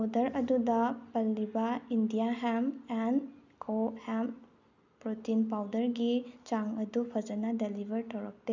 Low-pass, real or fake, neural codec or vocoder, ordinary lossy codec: 7.2 kHz; real; none; none